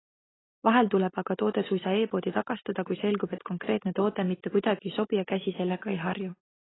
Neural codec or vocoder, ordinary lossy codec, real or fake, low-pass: none; AAC, 16 kbps; real; 7.2 kHz